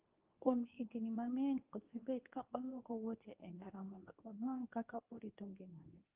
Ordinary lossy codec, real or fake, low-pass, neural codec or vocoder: Opus, 32 kbps; fake; 3.6 kHz; codec, 24 kHz, 0.9 kbps, WavTokenizer, medium speech release version 2